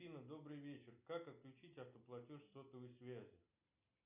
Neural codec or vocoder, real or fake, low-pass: none; real; 3.6 kHz